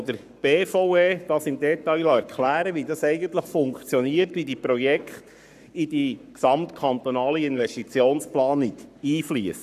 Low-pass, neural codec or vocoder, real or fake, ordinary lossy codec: 14.4 kHz; codec, 44.1 kHz, 7.8 kbps, Pupu-Codec; fake; none